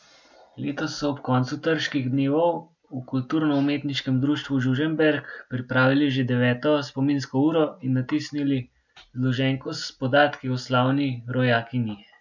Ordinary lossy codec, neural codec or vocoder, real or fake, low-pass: none; none; real; 7.2 kHz